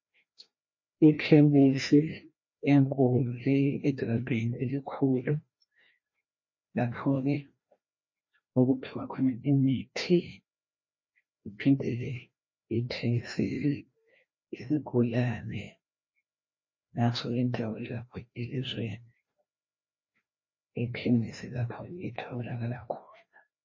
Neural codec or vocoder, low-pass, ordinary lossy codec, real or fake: codec, 16 kHz, 1 kbps, FreqCodec, larger model; 7.2 kHz; MP3, 32 kbps; fake